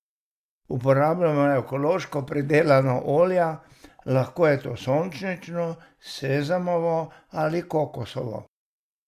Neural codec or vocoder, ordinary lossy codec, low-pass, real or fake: none; Opus, 64 kbps; 14.4 kHz; real